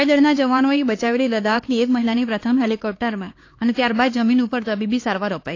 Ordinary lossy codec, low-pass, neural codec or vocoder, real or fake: AAC, 32 kbps; 7.2 kHz; codec, 16 kHz, 4 kbps, X-Codec, HuBERT features, trained on LibriSpeech; fake